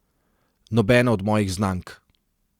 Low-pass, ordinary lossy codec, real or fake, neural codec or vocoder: 19.8 kHz; Opus, 64 kbps; real; none